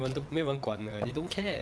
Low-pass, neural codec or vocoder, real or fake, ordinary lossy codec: none; vocoder, 22.05 kHz, 80 mel bands, WaveNeXt; fake; none